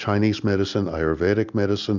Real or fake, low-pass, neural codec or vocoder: real; 7.2 kHz; none